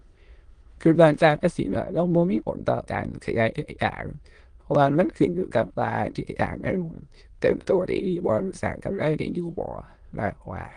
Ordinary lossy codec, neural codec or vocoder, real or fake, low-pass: Opus, 24 kbps; autoencoder, 22.05 kHz, a latent of 192 numbers a frame, VITS, trained on many speakers; fake; 9.9 kHz